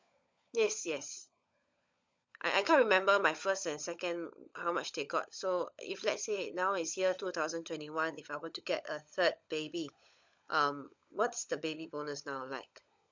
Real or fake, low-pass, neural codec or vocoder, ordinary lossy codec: fake; 7.2 kHz; codec, 16 kHz, 16 kbps, FunCodec, trained on LibriTTS, 50 frames a second; MP3, 64 kbps